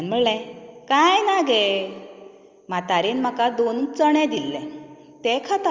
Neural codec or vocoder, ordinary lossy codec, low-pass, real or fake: none; Opus, 32 kbps; 7.2 kHz; real